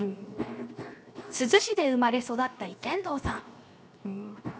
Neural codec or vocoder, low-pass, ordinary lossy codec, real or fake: codec, 16 kHz, 0.7 kbps, FocalCodec; none; none; fake